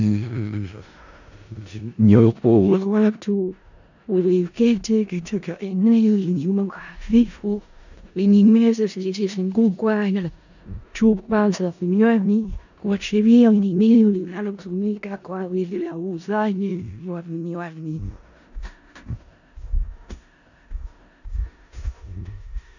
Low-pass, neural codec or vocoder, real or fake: 7.2 kHz; codec, 16 kHz in and 24 kHz out, 0.4 kbps, LongCat-Audio-Codec, four codebook decoder; fake